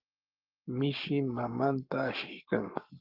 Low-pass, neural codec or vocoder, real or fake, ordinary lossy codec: 5.4 kHz; codec, 44.1 kHz, 7.8 kbps, Pupu-Codec; fake; Opus, 32 kbps